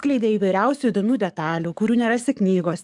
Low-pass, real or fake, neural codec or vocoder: 10.8 kHz; fake; codec, 44.1 kHz, 7.8 kbps, DAC